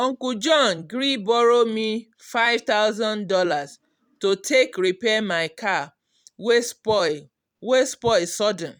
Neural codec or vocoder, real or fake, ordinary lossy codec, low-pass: vocoder, 44.1 kHz, 128 mel bands every 256 samples, BigVGAN v2; fake; none; 19.8 kHz